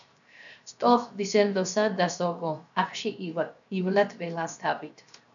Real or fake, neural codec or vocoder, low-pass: fake; codec, 16 kHz, 0.7 kbps, FocalCodec; 7.2 kHz